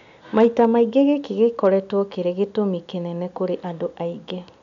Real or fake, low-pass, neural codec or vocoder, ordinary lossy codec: real; 7.2 kHz; none; MP3, 96 kbps